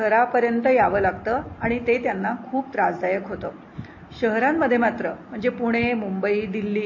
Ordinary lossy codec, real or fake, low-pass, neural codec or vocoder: MP3, 32 kbps; real; 7.2 kHz; none